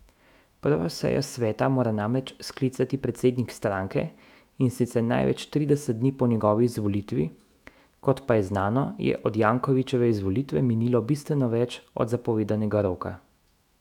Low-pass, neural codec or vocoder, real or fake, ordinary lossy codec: 19.8 kHz; autoencoder, 48 kHz, 128 numbers a frame, DAC-VAE, trained on Japanese speech; fake; none